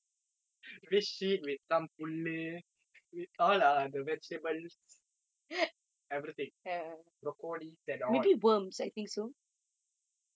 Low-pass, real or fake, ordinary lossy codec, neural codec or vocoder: none; real; none; none